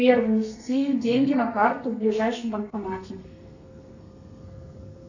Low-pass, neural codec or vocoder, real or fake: 7.2 kHz; codec, 32 kHz, 1.9 kbps, SNAC; fake